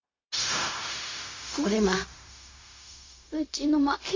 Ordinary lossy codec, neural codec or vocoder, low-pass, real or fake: AAC, 32 kbps; codec, 16 kHz, 0.4 kbps, LongCat-Audio-Codec; 7.2 kHz; fake